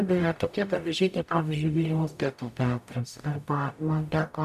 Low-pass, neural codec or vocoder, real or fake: 14.4 kHz; codec, 44.1 kHz, 0.9 kbps, DAC; fake